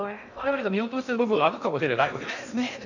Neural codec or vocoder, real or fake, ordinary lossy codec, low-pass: codec, 16 kHz in and 24 kHz out, 0.6 kbps, FocalCodec, streaming, 2048 codes; fake; none; 7.2 kHz